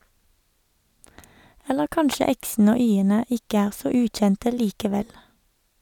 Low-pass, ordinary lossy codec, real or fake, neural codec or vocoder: 19.8 kHz; none; real; none